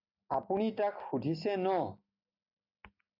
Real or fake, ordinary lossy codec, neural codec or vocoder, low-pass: real; AAC, 32 kbps; none; 5.4 kHz